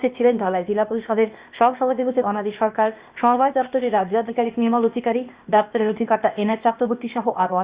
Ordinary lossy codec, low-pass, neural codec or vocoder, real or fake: Opus, 64 kbps; 3.6 kHz; codec, 16 kHz, 0.8 kbps, ZipCodec; fake